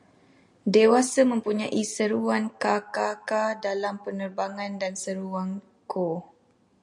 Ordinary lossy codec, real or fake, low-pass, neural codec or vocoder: MP3, 64 kbps; real; 10.8 kHz; none